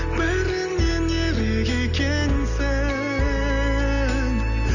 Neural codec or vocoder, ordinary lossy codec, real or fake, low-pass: none; none; real; 7.2 kHz